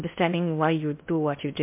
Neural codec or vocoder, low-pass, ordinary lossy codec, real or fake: codec, 16 kHz in and 24 kHz out, 0.6 kbps, FocalCodec, streaming, 4096 codes; 3.6 kHz; MP3, 32 kbps; fake